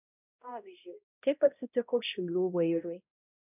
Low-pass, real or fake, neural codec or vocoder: 3.6 kHz; fake; codec, 16 kHz, 0.5 kbps, X-Codec, HuBERT features, trained on balanced general audio